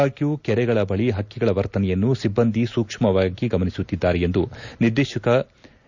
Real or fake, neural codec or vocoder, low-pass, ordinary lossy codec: real; none; 7.2 kHz; none